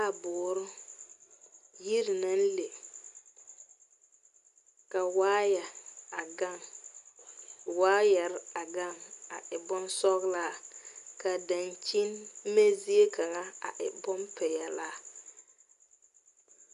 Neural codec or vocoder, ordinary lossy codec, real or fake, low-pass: none; Opus, 64 kbps; real; 10.8 kHz